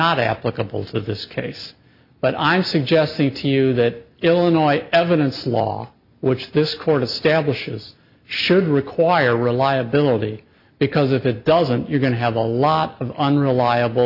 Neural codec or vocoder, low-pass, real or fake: none; 5.4 kHz; real